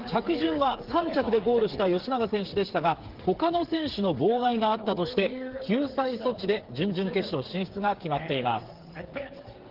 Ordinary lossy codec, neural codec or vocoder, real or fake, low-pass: Opus, 16 kbps; codec, 16 kHz, 8 kbps, FreqCodec, smaller model; fake; 5.4 kHz